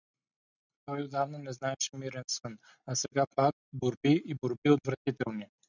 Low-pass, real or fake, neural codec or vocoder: 7.2 kHz; real; none